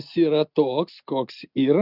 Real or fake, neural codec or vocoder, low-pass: real; none; 5.4 kHz